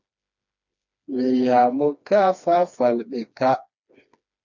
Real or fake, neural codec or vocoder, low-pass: fake; codec, 16 kHz, 2 kbps, FreqCodec, smaller model; 7.2 kHz